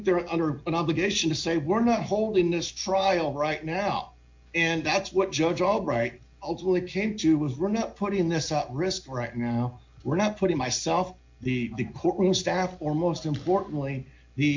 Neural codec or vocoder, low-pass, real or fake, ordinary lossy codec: none; 7.2 kHz; real; MP3, 48 kbps